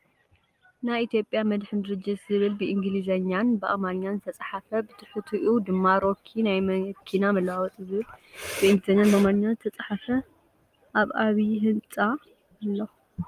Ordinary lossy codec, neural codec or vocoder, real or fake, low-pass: Opus, 32 kbps; none; real; 14.4 kHz